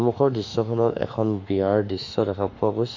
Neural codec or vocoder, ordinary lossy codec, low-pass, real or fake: autoencoder, 48 kHz, 32 numbers a frame, DAC-VAE, trained on Japanese speech; MP3, 48 kbps; 7.2 kHz; fake